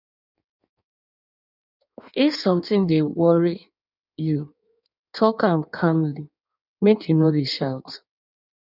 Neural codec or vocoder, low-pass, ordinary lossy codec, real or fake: codec, 16 kHz in and 24 kHz out, 1.1 kbps, FireRedTTS-2 codec; 5.4 kHz; none; fake